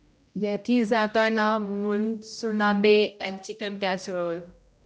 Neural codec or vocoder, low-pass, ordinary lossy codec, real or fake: codec, 16 kHz, 0.5 kbps, X-Codec, HuBERT features, trained on general audio; none; none; fake